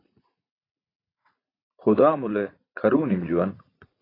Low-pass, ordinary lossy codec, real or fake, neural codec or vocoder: 5.4 kHz; AAC, 24 kbps; fake; vocoder, 44.1 kHz, 80 mel bands, Vocos